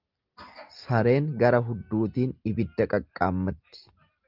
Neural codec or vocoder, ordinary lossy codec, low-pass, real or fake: none; Opus, 24 kbps; 5.4 kHz; real